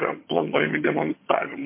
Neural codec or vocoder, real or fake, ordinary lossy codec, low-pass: vocoder, 22.05 kHz, 80 mel bands, HiFi-GAN; fake; MP3, 24 kbps; 3.6 kHz